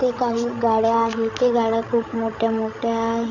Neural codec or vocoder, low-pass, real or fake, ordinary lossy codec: codec, 16 kHz, 16 kbps, FreqCodec, larger model; 7.2 kHz; fake; none